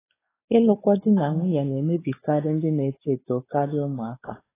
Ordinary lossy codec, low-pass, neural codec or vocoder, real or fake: AAC, 16 kbps; 3.6 kHz; codec, 16 kHz, 4 kbps, X-Codec, WavLM features, trained on Multilingual LibriSpeech; fake